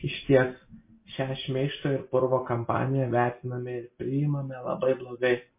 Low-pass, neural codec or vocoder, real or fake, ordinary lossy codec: 3.6 kHz; none; real; MP3, 24 kbps